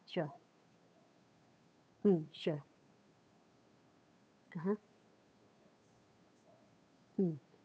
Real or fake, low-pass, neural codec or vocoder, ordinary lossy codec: fake; none; codec, 16 kHz, 2 kbps, FunCodec, trained on Chinese and English, 25 frames a second; none